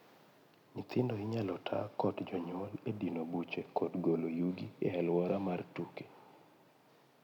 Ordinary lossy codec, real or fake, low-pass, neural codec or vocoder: none; real; 19.8 kHz; none